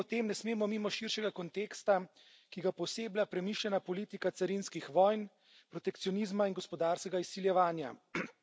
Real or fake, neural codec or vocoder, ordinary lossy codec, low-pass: real; none; none; none